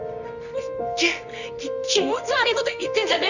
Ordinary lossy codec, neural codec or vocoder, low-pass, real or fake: none; codec, 24 kHz, 0.9 kbps, WavTokenizer, medium music audio release; 7.2 kHz; fake